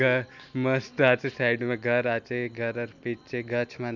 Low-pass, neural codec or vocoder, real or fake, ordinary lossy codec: 7.2 kHz; none; real; none